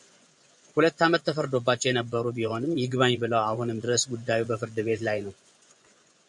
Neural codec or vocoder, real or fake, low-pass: vocoder, 24 kHz, 100 mel bands, Vocos; fake; 10.8 kHz